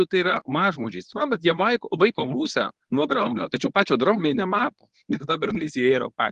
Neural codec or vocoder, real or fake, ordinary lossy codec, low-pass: codec, 24 kHz, 0.9 kbps, WavTokenizer, medium speech release version 1; fake; Opus, 32 kbps; 10.8 kHz